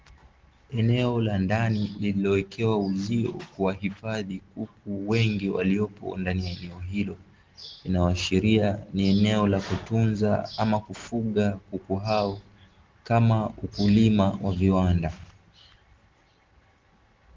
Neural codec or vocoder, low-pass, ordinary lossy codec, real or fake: none; 7.2 kHz; Opus, 16 kbps; real